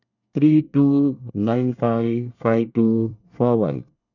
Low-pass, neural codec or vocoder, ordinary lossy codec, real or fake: 7.2 kHz; codec, 24 kHz, 1 kbps, SNAC; none; fake